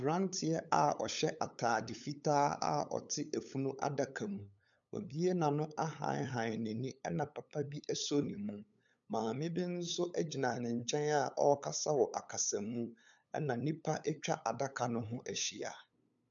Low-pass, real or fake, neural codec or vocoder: 7.2 kHz; fake; codec, 16 kHz, 8 kbps, FunCodec, trained on LibriTTS, 25 frames a second